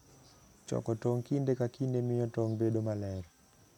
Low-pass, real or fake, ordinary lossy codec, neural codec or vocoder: 19.8 kHz; real; none; none